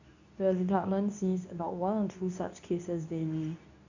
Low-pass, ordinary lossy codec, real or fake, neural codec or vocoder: 7.2 kHz; none; fake; codec, 24 kHz, 0.9 kbps, WavTokenizer, medium speech release version 2